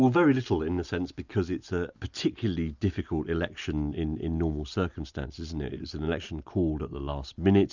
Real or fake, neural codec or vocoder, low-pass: real; none; 7.2 kHz